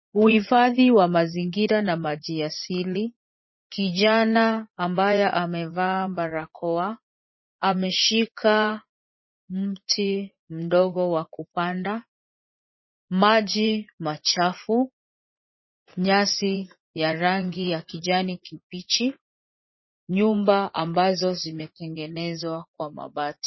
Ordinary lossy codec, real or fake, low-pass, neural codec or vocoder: MP3, 24 kbps; fake; 7.2 kHz; vocoder, 44.1 kHz, 80 mel bands, Vocos